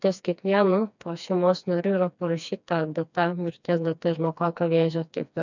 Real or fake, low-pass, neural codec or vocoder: fake; 7.2 kHz; codec, 16 kHz, 2 kbps, FreqCodec, smaller model